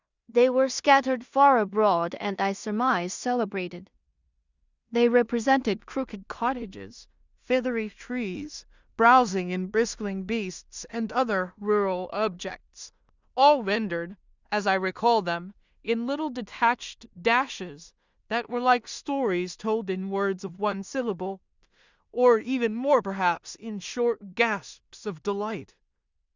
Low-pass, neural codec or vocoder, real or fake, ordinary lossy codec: 7.2 kHz; codec, 16 kHz in and 24 kHz out, 0.4 kbps, LongCat-Audio-Codec, two codebook decoder; fake; Opus, 64 kbps